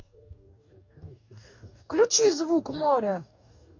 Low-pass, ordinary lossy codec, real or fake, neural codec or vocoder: 7.2 kHz; MP3, 64 kbps; fake; codec, 44.1 kHz, 2.6 kbps, DAC